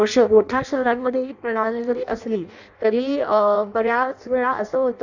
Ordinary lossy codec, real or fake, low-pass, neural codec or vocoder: none; fake; 7.2 kHz; codec, 16 kHz in and 24 kHz out, 0.6 kbps, FireRedTTS-2 codec